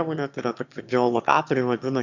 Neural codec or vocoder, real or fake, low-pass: autoencoder, 22.05 kHz, a latent of 192 numbers a frame, VITS, trained on one speaker; fake; 7.2 kHz